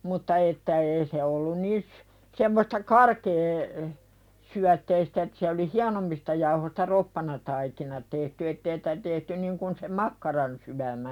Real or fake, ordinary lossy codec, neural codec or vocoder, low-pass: real; none; none; 19.8 kHz